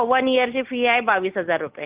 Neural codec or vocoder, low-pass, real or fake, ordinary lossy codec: none; 3.6 kHz; real; Opus, 32 kbps